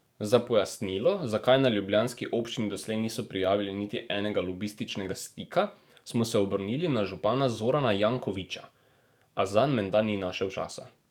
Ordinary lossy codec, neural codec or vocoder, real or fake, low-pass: none; codec, 44.1 kHz, 7.8 kbps, DAC; fake; 19.8 kHz